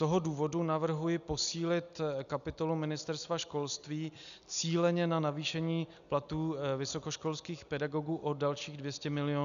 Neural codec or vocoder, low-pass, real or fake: none; 7.2 kHz; real